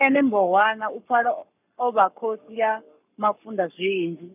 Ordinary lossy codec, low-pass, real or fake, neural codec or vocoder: none; 3.6 kHz; fake; codec, 44.1 kHz, 7.8 kbps, Pupu-Codec